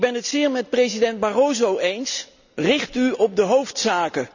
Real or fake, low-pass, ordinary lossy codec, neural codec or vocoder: real; 7.2 kHz; none; none